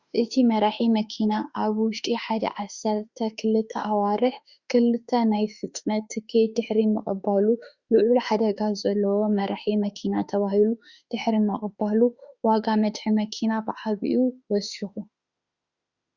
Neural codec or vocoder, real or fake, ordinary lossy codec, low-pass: autoencoder, 48 kHz, 32 numbers a frame, DAC-VAE, trained on Japanese speech; fake; Opus, 64 kbps; 7.2 kHz